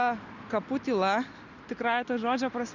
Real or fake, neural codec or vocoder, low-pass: real; none; 7.2 kHz